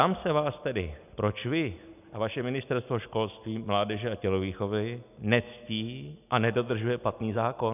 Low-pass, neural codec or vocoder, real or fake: 3.6 kHz; none; real